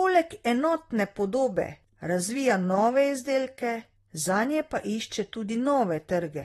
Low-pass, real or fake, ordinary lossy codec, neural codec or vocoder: 19.8 kHz; fake; AAC, 32 kbps; vocoder, 44.1 kHz, 128 mel bands, Pupu-Vocoder